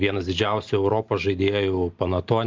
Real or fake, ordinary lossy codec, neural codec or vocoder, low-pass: real; Opus, 24 kbps; none; 7.2 kHz